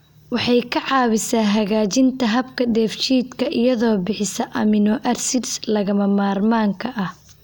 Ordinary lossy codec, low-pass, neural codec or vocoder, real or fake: none; none; none; real